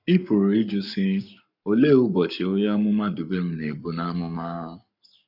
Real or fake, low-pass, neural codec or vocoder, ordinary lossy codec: fake; 5.4 kHz; codec, 44.1 kHz, 7.8 kbps, Pupu-Codec; none